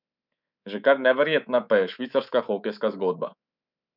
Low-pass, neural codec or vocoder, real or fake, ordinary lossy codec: 5.4 kHz; codec, 24 kHz, 3.1 kbps, DualCodec; fake; none